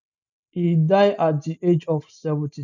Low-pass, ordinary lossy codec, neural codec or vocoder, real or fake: 7.2 kHz; none; none; real